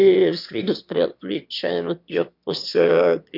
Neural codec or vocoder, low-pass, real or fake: autoencoder, 22.05 kHz, a latent of 192 numbers a frame, VITS, trained on one speaker; 5.4 kHz; fake